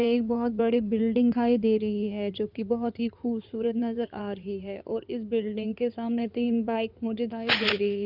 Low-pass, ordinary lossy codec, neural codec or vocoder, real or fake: 5.4 kHz; none; codec, 16 kHz in and 24 kHz out, 2.2 kbps, FireRedTTS-2 codec; fake